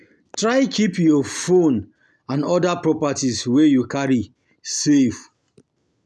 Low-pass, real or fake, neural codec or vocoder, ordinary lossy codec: none; real; none; none